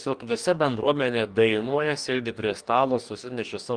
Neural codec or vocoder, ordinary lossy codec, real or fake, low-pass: codec, 44.1 kHz, 2.6 kbps, DAC; Opus, 32 kbps; fake; 9.9 kHz